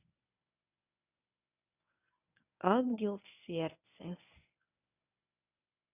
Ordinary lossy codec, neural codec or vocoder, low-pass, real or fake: none; codec, 24 kHz, 0.9 kbps, WavTokenizer, medium speech release version 1; 3.6 kHz; fake